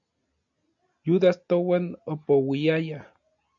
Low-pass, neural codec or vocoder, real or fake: 7.2 kHz; none; real